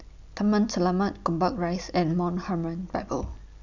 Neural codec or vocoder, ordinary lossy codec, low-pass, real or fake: none; none; 7.2 kHz; real